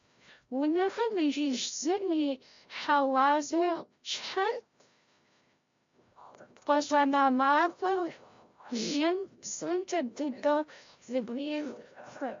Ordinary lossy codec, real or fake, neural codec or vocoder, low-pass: MP3, 48 kbps; fake; codec, 16 kHz, 0.5 kbps, FreqCodec, larger model; 7.2 kHz